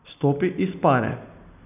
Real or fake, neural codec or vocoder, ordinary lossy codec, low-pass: real; none; none; 3.6 kHz